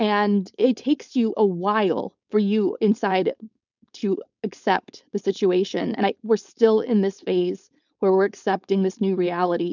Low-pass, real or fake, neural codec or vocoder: 7.2 kHz; fake; codec, 16 kHz, 4.8 kbps, FACodec